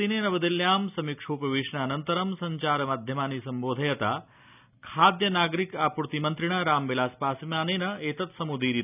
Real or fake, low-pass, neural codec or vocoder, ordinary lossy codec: real; 3.6 kHz; none; none